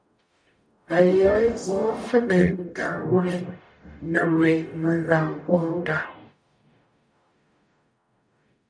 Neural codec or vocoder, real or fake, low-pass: codec, 44.1 kHz, 0.9 kbps, DAC; fake; 9.9 kHz